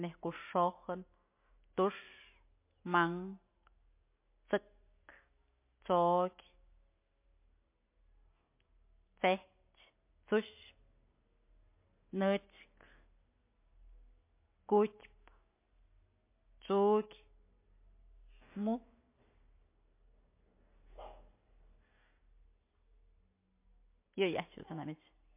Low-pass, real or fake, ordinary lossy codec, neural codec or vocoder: 3.6 kHz; real; MP3, 24 kbps; none